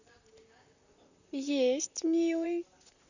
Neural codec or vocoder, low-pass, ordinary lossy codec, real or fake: vocoder, 44.1 kHz, 128 mel bands, Pupu-Vocoder; 7.2 kHz; none; fake